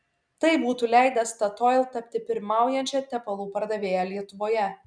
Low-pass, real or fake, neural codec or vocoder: 9.9 kHz; real; none